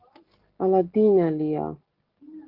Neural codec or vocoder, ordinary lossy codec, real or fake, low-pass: none; Opus, 16 kbps; real; 5.4 kHz